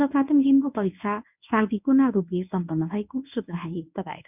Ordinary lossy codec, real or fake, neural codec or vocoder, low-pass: none; fake; codec, 24 kHz, 0.9 kbps, WavTokenizer, medium speech release version 1; 3.6 kHz